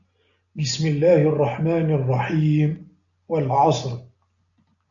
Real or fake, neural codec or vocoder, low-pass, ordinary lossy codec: real; none; 7.2 kHz; AAC, 64 kbps